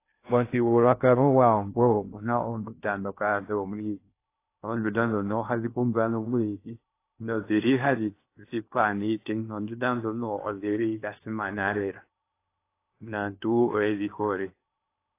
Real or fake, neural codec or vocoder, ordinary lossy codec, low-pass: fake; codec, 16 kHz in and 24 kHz out, 0.6 kbps, FocalCodec, streaming, 2048 codes; AAC, 24 kbps; 3.6 kHz